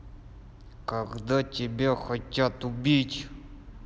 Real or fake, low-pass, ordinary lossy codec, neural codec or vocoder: real; none; none; none